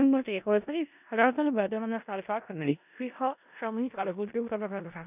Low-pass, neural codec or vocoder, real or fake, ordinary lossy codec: 3.6 kHz; codec, 16 kHz in and 24 kHz out, 0.4 kbps, LongCat-Audio-Codec, four codebook decoder; fake; none